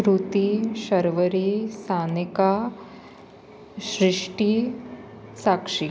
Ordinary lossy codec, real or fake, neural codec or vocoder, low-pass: none; real; none; none